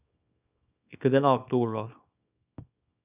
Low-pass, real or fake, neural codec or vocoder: 3.6 kHz; fake; codec, 24 kHz, 0.9 kbps, WavTokenizer, small release